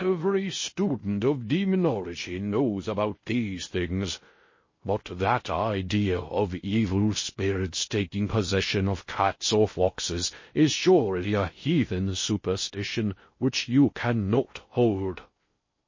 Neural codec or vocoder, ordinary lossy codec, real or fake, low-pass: codec, 16 kHz in and 24 kHz out, 0.6 kbps, FocalCodec, streaming, 2048 codes; MP3, 32 kbps; fake; 7.2 kHz